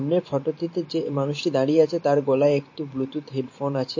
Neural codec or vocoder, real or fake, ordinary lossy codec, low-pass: vocoder, 44.1 kHz, 128 mel bands every 512 samples, BigVGAN v2; fake; MP3, 32 kbps; 7.2 kHz